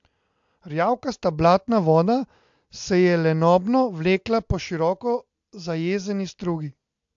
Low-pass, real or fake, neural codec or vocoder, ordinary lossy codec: 7.2 kHz; real; none; AAC, 64 kbps